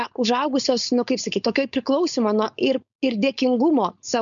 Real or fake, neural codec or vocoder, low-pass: fake; codec, 16 kHz, 4.8 kbps, FACodec; 7.2 kHz